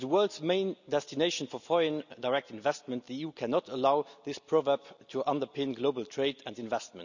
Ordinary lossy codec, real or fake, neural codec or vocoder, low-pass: none; real; none; 7.2 kHz